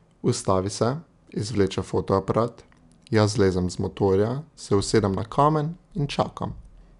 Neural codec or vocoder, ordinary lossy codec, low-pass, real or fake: none; none; 10.8 kHz; real